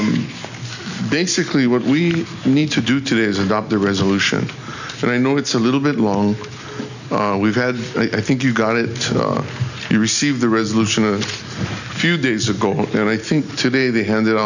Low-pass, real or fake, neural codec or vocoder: 7.2 kHz; real; none